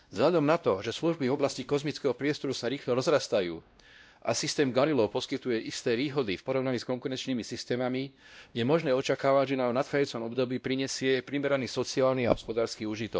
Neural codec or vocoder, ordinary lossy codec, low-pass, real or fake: codec, 16 kHz, 1 kbps, X-Codec, WavLM features, trained on Multilingual LibriSpeech; none; none; fake